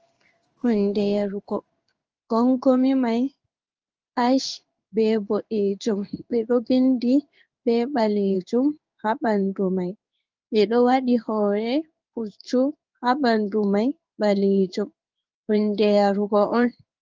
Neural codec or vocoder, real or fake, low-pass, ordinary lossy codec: codec, 16 kHz in and 24 kHz out, 1 kbps, XY-Tokenizer; fake; 7.2 kHz; Opus, 24 kbps